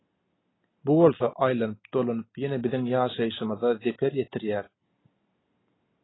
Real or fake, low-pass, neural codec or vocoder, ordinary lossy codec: real; 7.2 kHz; none; AAC, 16 kbps